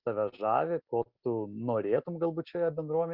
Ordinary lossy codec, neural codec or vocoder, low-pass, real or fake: Opus, 64 kbps; none; 5.4 kHz; real